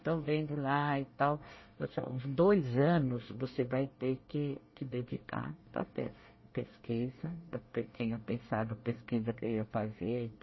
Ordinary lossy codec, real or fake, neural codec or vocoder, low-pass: MP3, 24 kbps; fake; codec, 24 kHz, 1 kbps, SNAC; 7.2 kHz